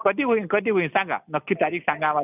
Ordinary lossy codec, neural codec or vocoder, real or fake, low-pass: none; none; real; 3.6 kHz